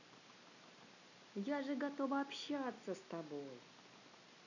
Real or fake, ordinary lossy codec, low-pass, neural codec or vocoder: real; none; 7.2 kHz; none